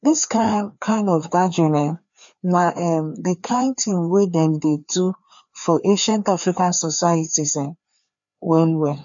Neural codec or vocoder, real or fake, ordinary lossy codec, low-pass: codec, 16 kHz, 2 kbps, FreqCodec, larger model; fake; MP3, 64 kbps; 7.2 kHz